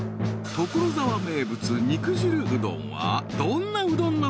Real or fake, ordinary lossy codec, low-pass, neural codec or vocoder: real; none; none; none